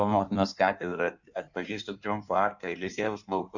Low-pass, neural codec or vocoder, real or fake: 7.2 kHz; codec, 16 kHz in and 24 kHz out, 1.1 kbps, FireRedTTS-2 codec; fake